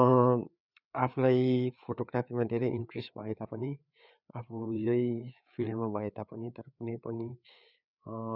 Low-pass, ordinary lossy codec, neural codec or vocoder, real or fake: 5.4 kHz; none; codec, 16 kHz, 4 kbps, FreqCodec, larger model; fake